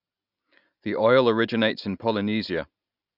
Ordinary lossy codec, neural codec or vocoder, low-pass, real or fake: none; none; 5.4 kHz; real